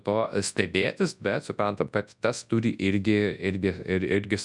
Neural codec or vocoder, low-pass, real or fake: codec, 24 kHz, 0.9 kbps, WavTokenizer, large speech release; 10.8 kHz; fake